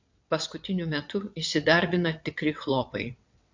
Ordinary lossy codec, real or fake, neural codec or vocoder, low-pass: MP3, 48 kbps; fake; vocoder, 22.05 kHz, 80 mel bands, WaveNeXt; 7.2 kHz